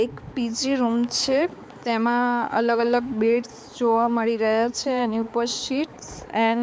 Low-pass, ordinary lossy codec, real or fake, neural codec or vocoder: none; none; fake; codec, 16 kHz, 4 kbps, X-Codec, HuBERT features, trained on balanced general audio